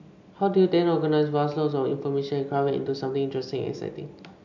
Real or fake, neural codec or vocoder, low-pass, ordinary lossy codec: real; none; 7.2 kHz; MP3, 64 kbps